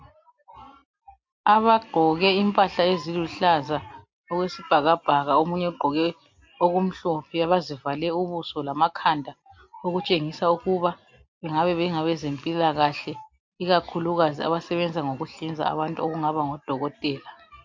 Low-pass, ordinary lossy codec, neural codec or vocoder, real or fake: 7.2 kHz; MP3, 48 kbps; none; real